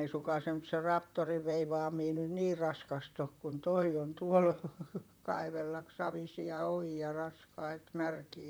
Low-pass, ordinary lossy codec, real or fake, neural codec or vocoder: none; none; fake; vocoder, 44.1 kHz, 128 mel bands, Pupu-Vocoder